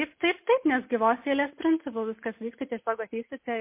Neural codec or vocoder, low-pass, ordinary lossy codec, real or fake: none; 3.6 kHz; MP3, 24 kbps; real